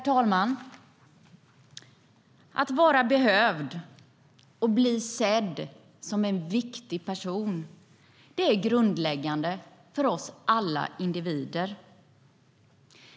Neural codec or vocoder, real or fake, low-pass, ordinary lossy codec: none; real; none; none